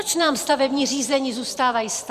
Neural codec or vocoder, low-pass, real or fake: vocoder, 44.1 kHz, 128 mel bands every 512 samples, BigVGAN v2; 14.4 kHz; fake